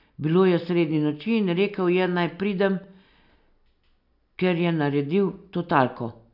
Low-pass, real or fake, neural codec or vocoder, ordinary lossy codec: 5.4 kHz; real; none; none